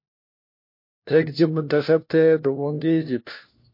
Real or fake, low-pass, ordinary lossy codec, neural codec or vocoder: fake; 5.4 kHz; MP3, 32 kbps; codec, 16 kHz, 1 kbps, FunCodec, trained on LibriTTS, 50 frames a second